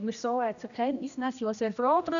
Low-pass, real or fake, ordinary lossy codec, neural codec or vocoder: 7.2 kHz; fake; none; codec, 16 kHz, 1 kbps, X-Codec, HuBERT features, trained on general audio